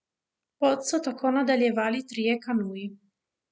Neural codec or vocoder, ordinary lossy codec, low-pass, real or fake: none; none; none; real